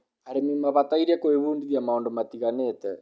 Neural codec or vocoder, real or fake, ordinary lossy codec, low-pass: none; real; none; none